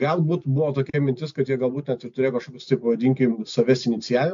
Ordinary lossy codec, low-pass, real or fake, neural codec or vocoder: MP3, 48 kbps; 7.2 kHz; real; none